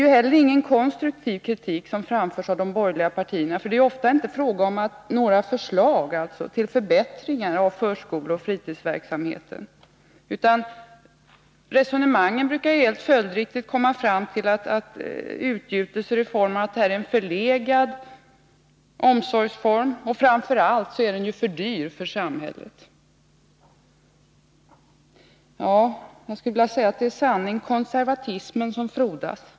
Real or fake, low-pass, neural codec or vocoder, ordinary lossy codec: real; none; none; none